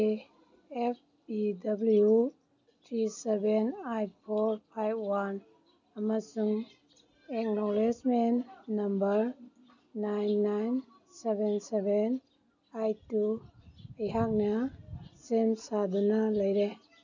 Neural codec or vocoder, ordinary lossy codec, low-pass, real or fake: none; none; 7.2 kHz; real